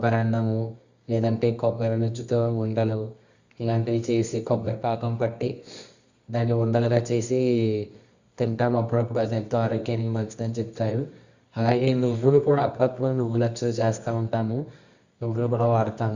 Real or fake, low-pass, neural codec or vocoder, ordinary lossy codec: fake; 7.2 kHz; codec, 24 kHz, 0.9 kbps, WavTokenizer, medium music audio release; Opus, 64 kbps